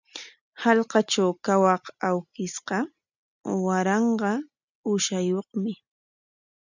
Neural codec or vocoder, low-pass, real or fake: none; 7.2 kHz; real